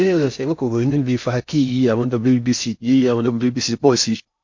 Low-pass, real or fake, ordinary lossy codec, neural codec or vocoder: 7.2 kHz; fake; MP3, 48 kbps; codec, 16 kHz in and 24 kHz out, 0.6 kbps, FocalCodec, streaming, 2048 codes